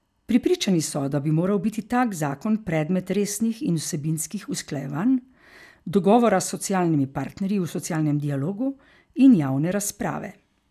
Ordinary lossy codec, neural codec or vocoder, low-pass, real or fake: none; none; 14.4 kHz; real